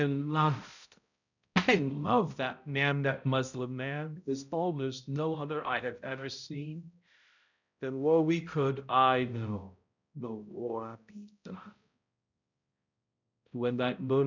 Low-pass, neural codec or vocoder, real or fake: 7.2 kHz; codec, 16 kHz, 0.5 kbps, X-Codec, HuBERT features, trained on balanced general audio; fake